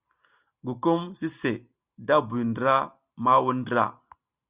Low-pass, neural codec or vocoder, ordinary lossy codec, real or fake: 3.6 kHz; none; Opus, 64 kbps; real